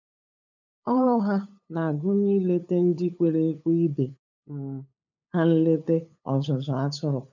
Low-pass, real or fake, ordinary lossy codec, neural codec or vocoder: 7.2 kHz; fake; none; codec, 16 kHz, 8 kbps, FunCodec, trained on LibriTTS, 25 frames a second